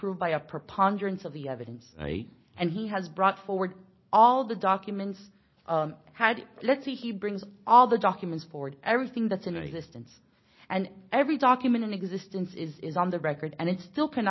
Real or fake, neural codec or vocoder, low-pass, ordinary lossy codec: real; none; 7.2 kHz; MP3, 24 kbps